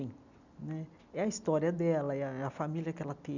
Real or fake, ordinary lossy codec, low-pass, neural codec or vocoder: real; none; 7.2 kHz; none